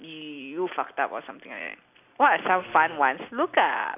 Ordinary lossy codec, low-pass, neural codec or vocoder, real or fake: none; 3.6 kHz; none; real